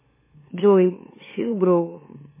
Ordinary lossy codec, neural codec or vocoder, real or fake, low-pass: MP3, 16 kbps; autoencoder, 44.1 kHz, a latent of 192 numbers a frame, MeloTTS; fake; 3.6 kHz